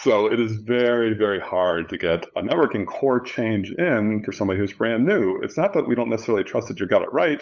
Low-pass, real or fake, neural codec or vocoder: 7.2 kHz; fake; codec, 16 kHz, 8 kbps, FunCodec, trained on LibriTTS, 25 frames a second